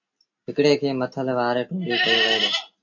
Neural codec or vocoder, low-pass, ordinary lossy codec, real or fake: none; 7.2 kHz; AAC, 48 kbps; real